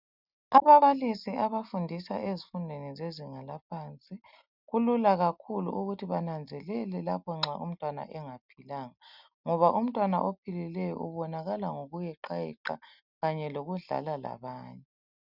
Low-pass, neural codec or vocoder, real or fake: 5.4 kHz; none; real